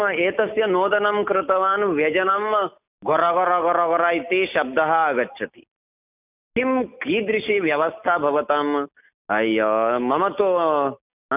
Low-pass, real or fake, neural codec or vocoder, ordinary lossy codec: 3.6 kHz; real; none; none